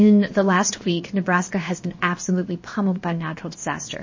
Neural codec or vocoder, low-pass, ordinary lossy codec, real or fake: codec, 16 kHz, 0.7 kbps, FocalCodec; 7.2 kHz; MP3, 32 kbps; fake